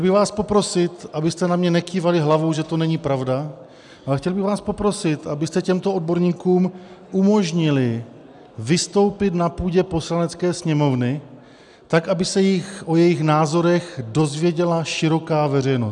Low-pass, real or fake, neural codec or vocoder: 10.8 kHz; real; none